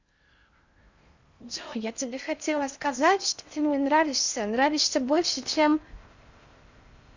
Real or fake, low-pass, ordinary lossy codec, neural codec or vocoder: fake; 7.2 kHz; Opus, 64 kbps; codec, 16 kHz in and 24 kHz out, 0.6 kbps, FocalCodec, streaming, 4096 codes